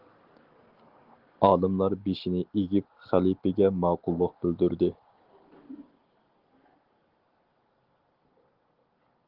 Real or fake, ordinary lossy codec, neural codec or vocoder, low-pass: real; Opus, 16 kbps; none; 5.4 kHz